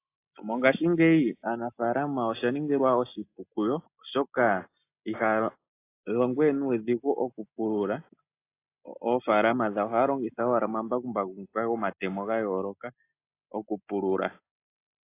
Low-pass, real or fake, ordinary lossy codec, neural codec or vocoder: 3.6 kHz; real; AAC, 24 kbps; none